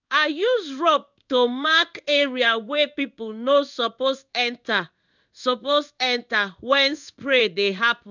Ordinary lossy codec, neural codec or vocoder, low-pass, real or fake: none; codec, 16 kHz in and 24 kHz out, 1 kbps, XY-Tokenizer; 7.2 kHz; fake